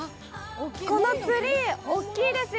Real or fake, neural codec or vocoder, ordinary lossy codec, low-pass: real; none; none; none